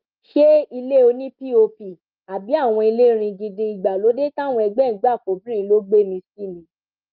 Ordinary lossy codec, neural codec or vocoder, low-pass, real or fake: Opus, 24 kbps; none; 5.4 kHz; real